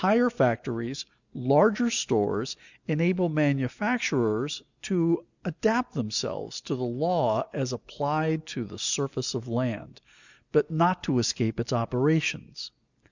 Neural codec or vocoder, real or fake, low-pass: none; real; 7.2 kHz